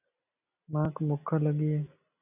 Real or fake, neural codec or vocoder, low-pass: fake; vocoder, 44.1 kHz, 128 mel bands every 256 samples, BigVGAN v2; 3.6 kHz